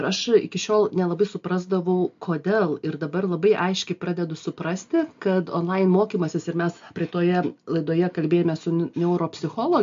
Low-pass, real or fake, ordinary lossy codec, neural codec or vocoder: 7.2 kHz; real; MP3, 48 kbps; none